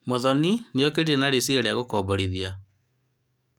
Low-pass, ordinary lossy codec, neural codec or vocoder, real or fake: 19.8 kHz; none; codec, 44.1 kHz, 7.8 kbps, DAC; fake